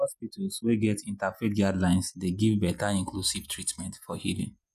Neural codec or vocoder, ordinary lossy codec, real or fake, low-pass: vocoder, 48 kHz, 128 mel bands, Vocos; none; fake; 14.4 kHz